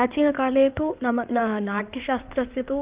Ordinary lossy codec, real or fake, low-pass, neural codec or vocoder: Opus, 32 kbps; fake; 3.6 kHz; codec, 16 kHz in and 24 kHz out, 2.2 kbps, FireRedTTS-2 codec